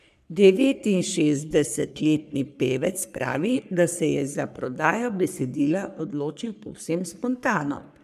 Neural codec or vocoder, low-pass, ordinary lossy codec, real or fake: codec, 44.1 kHz, 3.4 kbps, Pupu-Codec; 14.4 kHz; none; fake